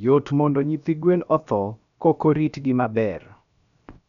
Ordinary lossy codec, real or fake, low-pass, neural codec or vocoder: none; fake; 7.2 kHz; codec, 16 kHz, 0.7 kbps, FocalCodec